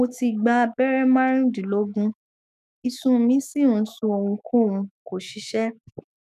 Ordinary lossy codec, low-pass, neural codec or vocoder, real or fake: none; 14.4 kHz; autoencoder, 48 kHz, 128 numbers a frame, DAC-VAE, trained on Japanese speech; fake